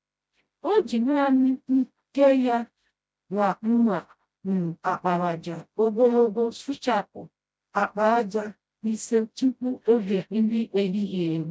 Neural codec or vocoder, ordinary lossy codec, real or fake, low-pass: codec, 16 kHz, 0.5 kbps, FreqCodec, smaller model; none; fake; none